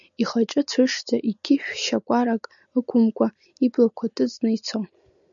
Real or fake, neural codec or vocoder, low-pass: real; none; 7.2 kHz